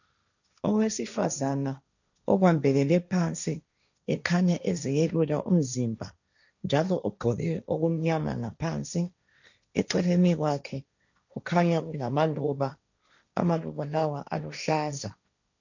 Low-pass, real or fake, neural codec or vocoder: 7.2 kHz; fake; codec, 16 kHz, 1.1 kbps, Voila-Tokenizer